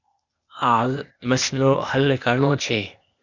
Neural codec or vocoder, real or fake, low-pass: codec, 16 kHz, 0.8 kbps, ZipCodec; fake; 7.2 kHz